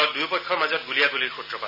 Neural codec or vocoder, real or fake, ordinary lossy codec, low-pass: none; real; none; 5.4 kHz